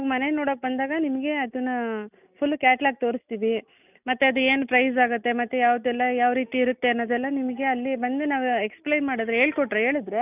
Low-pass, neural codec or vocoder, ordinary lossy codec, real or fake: 3.6 kHz; none; none; real